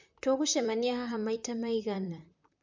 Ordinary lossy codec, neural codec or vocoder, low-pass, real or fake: MP3, 64 kbps; vocoder, 44.1 kHz, 128 mel bands, Pupu-Vocoder; 7.2 kHz; fake